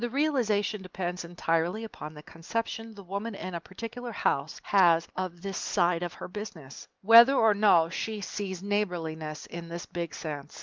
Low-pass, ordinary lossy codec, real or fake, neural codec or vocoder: 7.2 kHz; Opus, 24 kbps; real; none